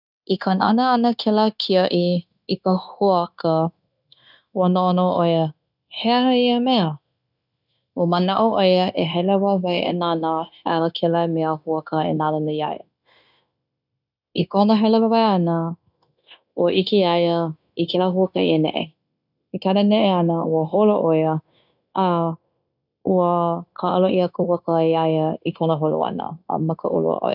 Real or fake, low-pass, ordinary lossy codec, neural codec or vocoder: fake; 5.4 kHz; none; codec, 16 kHz, 0.9 kbps, LongCat-Audio-Codec